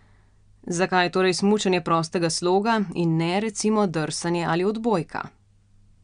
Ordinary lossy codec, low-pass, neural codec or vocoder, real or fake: MP3, 96 kbps; 9.9 kHz; none; real